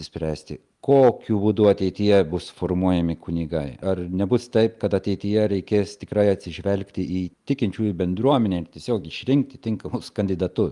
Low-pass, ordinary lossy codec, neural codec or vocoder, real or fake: 10.8 kHz; Opus, 32 kbps; none; real